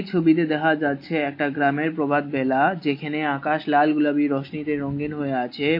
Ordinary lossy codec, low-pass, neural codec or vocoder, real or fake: MP3, 32 kbps; 5.4 kHz; none; real